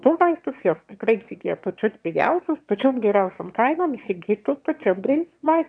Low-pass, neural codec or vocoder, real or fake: 9.9 kHz; autoencoder, 22.05 kHz, a latent of 192 numbers a frame, VITS, trained on one speaker; fake